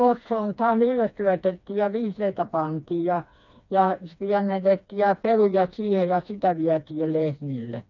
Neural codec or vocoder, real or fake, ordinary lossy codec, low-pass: codec, 16 kHz, 2 kbps, FreqCodec, smaller model; fake; none; 7.2 kHz